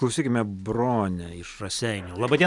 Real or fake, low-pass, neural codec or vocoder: real; 10.8 kHz; none